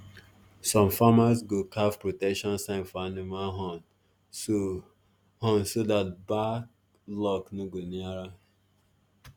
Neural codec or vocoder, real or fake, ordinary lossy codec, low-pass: none; real; none; none